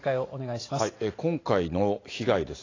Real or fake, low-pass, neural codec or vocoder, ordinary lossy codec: real; 7.2 kHz; none; AAC, 32 kbps